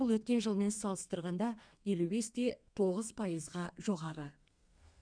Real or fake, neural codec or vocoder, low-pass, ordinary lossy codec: fake; codec, 32 kHz, 1.9 kbps, SNAC; 9.9 kHz; none